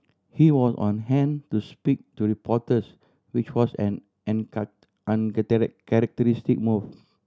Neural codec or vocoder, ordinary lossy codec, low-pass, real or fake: none; none; none; real